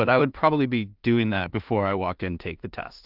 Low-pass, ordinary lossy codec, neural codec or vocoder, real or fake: 5.4 kHz; Opus, 32 kbps; codec, 16 kHz in and 24 kHz out, 0.4 kbps, LongCat-Audio-Codec, two codebook decoder; fake